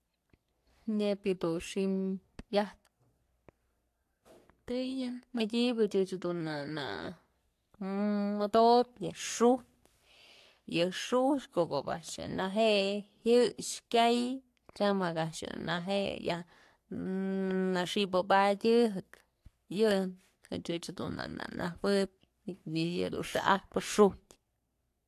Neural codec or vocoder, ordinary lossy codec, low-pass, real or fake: codec, 44.1 kHz, 3.4 kbps, Pupu-Codec; AAC, 64 kbps; 14.4 kHz; fake